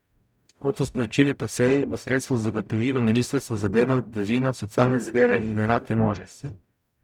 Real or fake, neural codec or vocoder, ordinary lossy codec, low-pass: fake; codec, 44.1 kHz, 0.9 kbps, DAC; none; 19.8 kHz